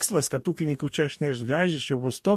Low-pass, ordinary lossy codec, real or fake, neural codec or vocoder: 14.4 kHz; MP3, 64 kbps; fake; codec, 44.1 kHz, 2.6 kbps, DAC